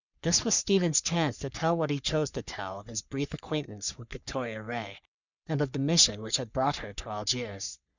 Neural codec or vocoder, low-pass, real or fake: codec, 44.1 kHz, 3.4 kbps, Pupu-Codec; 7.2 kHz; fake